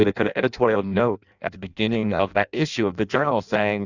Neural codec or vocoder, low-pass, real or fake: codec, 16 kHz in and 24 kHz out, 0.6 kbps, FireRedTTS-2 codec; 7.2 kHz; fake